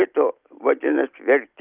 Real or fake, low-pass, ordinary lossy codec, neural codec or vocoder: real; 3.6 kHz; Opus, 32 kbps; none